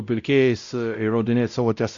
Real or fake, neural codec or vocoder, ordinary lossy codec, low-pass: fake; codec, 16 kHz, 0.5 kbps, X-Codec, WavLM features, trained on Multilingual LibriSpeech; Opus, 64 kbps; 7.2 kHz